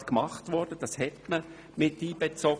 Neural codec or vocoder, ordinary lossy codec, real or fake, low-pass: none; none; real; none